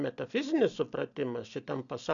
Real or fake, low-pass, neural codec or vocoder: real; 7.2 kHz; none